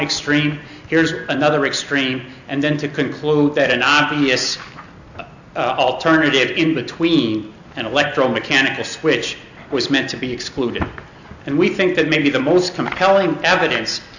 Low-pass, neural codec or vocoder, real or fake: 7.2 kHz; none; real